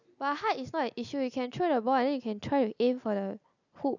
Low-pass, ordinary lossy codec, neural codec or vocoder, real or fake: 7.2 kHz; none; none; real